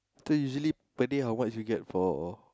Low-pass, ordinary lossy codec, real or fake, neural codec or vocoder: none; none; real; none